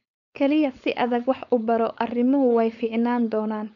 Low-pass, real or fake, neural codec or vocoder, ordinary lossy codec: 7.2 kHz; fake; codec, 16 kHz, 4.8 kbps, FACodec; MP3, 96 kbps